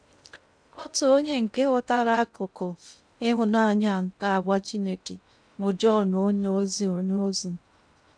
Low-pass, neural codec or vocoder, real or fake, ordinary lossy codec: 9.9 kHz; codec, 16 kHz in and 24 kHz out, 0.6 kbps, FocalCodec, streaming, 2048 codes; fake; none